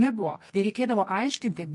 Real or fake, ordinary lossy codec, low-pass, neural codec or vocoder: fake; MP3, 48 kbps; 10.8 kHz; codec, 24 kHz, 0.9 kbps, WavTokenizer, medium music audio release